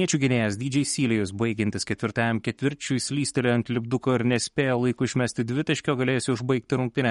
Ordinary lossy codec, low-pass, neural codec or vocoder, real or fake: MP3, 48 kbps; 19.8 kHz; codec, 44.1 kHz, 7.8 kbps, Pupu-Codec; fake